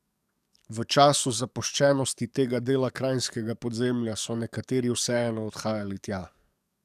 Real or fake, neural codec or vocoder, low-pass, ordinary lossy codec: fake; codec, 44.1 kHz, 7.8 kbps, DAC; 14.4 kHz; none